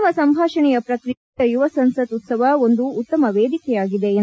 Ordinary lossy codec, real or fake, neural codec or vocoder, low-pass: none; real; none; none